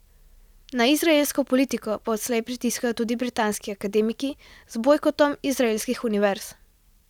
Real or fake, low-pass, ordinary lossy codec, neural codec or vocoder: real; 19.8 kHz; none; none